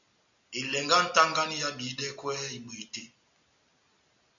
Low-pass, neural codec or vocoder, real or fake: 7.2 kHz; none; real